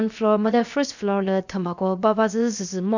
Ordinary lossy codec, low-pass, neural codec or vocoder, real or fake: none; 7.2 kHz; codec, 16 kHz, 0.7 kbps, FocalCodec; fake